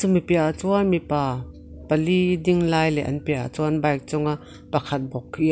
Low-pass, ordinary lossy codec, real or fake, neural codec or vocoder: none; none; real; none